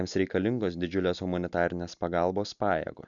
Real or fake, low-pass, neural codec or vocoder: real; 7.2 kHz; none